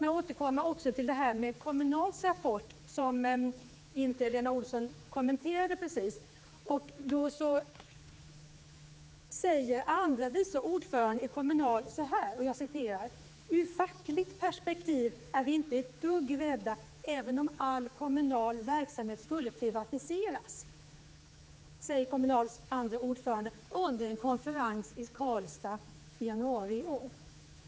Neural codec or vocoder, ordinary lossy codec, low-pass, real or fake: codec, 16 kHz, 4 kbps, X-Codec, HuBERT features, trained on general audio; none; none; fake